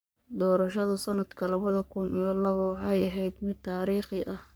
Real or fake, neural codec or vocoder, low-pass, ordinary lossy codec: fake; codec, 44.1 kHz, 3.4 kbps, Pupu-Codec; none; none